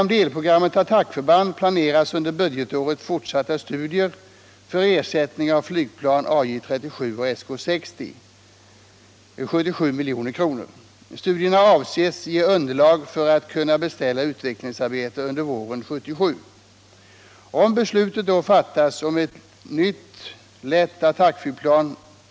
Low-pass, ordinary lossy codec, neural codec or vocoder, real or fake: none; none; none; real